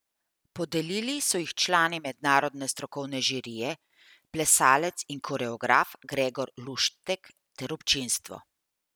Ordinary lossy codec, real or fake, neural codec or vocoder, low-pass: none; real; none; none